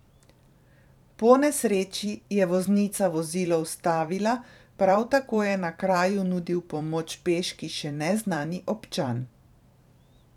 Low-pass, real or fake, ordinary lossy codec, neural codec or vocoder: 19.8 kHz; fake; none; vocoder, 44.1 kHz, 128 mel bands every 512 samples, BigVGAN v2